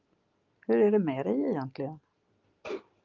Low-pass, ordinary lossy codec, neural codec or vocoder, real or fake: 7.2 kHz; Opus, 32 kbps; none; real